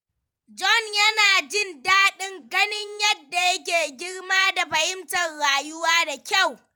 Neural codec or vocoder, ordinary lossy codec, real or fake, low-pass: vocoder, 48 kHz, 128 mel bands, Vocos; none; fake; none